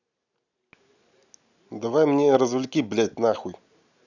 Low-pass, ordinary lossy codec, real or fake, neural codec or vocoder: 7.2 kHz; none; real; none